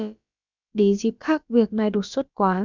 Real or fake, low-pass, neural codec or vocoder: fake; 7.2 kHz; codec, 16 kHz, about 1 kbps, DyCAST, with the encoder's durations